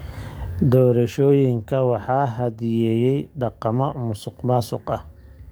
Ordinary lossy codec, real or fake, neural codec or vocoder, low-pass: none; fake; codec, 44.1 kHz, 7.8 kbps, DAC; none